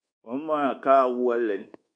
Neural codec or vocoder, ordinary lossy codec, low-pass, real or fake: codec, 24 kHz, 1.2 kbps, DualCodec; AAC, 64 kbps; 9.9 kHz; fake